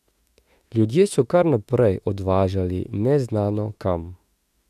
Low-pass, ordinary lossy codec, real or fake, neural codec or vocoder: 14.4 kHz; AAC, 96 kbps; fake; autoencoder, 48 kHz, 32 numbers a frame, DAC-VAE, trained on Japanese speech